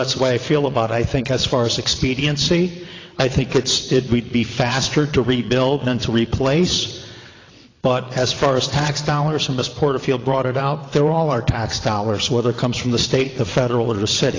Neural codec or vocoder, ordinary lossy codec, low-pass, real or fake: vocoder, 22.05 kHz, 80 mel bands, WaveNeXt; AAC, 32 kbps; 7.2 kHz; fake